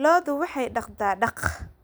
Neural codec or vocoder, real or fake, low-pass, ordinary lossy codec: none; real; none; none